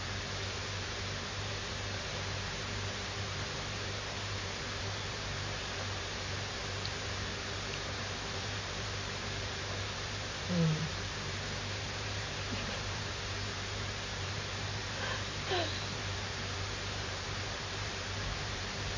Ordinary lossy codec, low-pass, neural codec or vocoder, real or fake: MP3, 32 kbps; 7.2 kHz; vocoder, 44.1 kHz, 128 mel bands every 512 samples, BigVGAN v2; fake